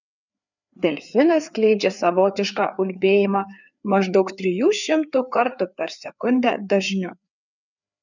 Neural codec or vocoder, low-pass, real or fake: codec, 16 kHz, 4 kbps, FreqCodec, larger model; 7.2 kHz; fake